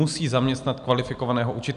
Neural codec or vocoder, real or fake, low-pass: none; real; 10.8 kHz